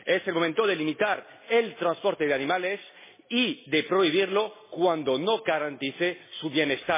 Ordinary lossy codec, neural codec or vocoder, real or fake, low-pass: MP3, 16 kbps; none; real; 3.6 kHz